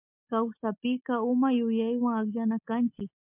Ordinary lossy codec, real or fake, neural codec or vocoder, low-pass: MP3, 32 kbps; real; none; 3.6 kHz